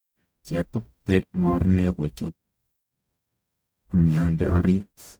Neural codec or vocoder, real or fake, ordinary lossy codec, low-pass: codec, 44.1 kHz, 0.9 kbps, DAC; fake; none; none